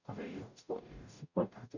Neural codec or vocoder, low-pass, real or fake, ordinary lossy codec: codec, 44.1 kHz, 0.9 kbps, DAC; 7.2 kHz; fake; none